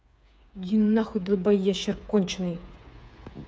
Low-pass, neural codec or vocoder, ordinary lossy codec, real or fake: none; codec, 16 kHz, 8 kbps, FreqCodec, smaller model; none; fake